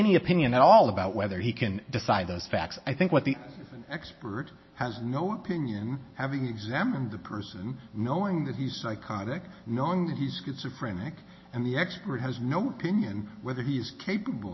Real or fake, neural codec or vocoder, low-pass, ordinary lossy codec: real; none; 7.2 kHz; MP3, 24 kbps